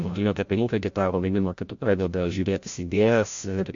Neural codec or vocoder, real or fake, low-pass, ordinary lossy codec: codec, 16 kHz, 0.5 kbps, FreqCodec, larger model; fake; 7.2 kHz; MP3, 48 kbps